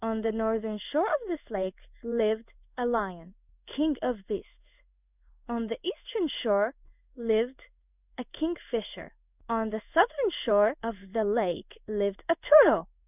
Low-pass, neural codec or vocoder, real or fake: 3.6 kHz; codec, 16 kHz in and 24 kHz out, 1 kbps, XY-Tokenizer; fake